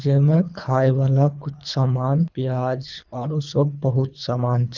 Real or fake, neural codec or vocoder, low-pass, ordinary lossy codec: fake; codec, 24 kHz, 3 kbps, HILCodec; 7.2 kHz; none